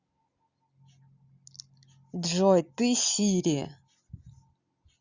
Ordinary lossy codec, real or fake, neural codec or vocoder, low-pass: Opus, 64 kbps; real; none; 7.2 kHz